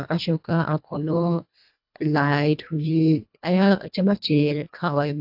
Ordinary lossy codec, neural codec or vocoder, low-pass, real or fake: AAC, 48 kbps; codec, 24 kHz, 1.5 kbps, HILCodec; 5.4 kHz; fake